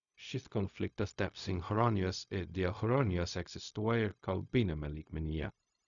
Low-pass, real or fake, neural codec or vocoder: 7.2 kHz; fake; codec, 16 kHz, 0.4 kbps, LongCat-Audio-Codec